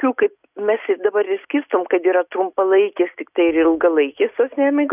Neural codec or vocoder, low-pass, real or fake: none; 3.6 kHz; real